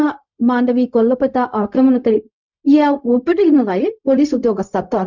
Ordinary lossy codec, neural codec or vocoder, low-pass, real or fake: none; codec, 16 kHz, 0.4 kbps, LongCat-Audio-Codec; 7.2 kHz; fake